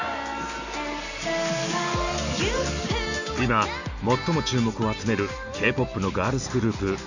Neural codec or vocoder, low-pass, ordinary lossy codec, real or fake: none; 7.2 kHz; none; real